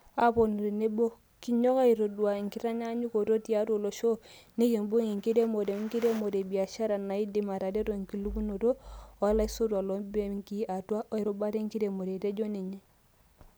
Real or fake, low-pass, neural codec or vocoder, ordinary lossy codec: real; none; none; none